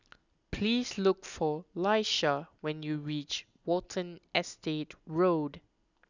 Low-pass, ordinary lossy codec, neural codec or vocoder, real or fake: 7.2 kHz; none; none; real